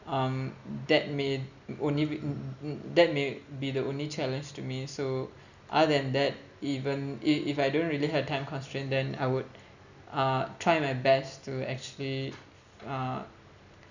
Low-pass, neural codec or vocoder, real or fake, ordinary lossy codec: 7.2 kHz; none; real; none